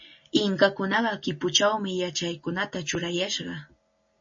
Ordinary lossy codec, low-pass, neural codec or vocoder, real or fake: MP3, 32 kbps; 7.2 kHz; none; real